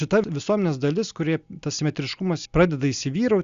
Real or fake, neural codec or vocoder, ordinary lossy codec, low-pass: real; none; Opus, 64 kbps; 7.2 kHz